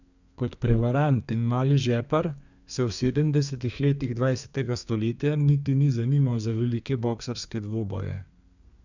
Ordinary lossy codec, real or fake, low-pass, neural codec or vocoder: none; fake; 7.2 kHz; codec, 32 kHz, 1.9 kbps, SNAC